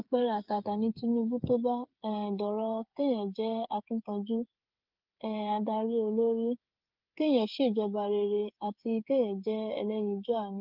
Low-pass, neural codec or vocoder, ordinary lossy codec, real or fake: 5.4 kHz; codec, 16 kHz, 8 kbps, FreqCodec, smaller model; Opus, 24 kbps; fake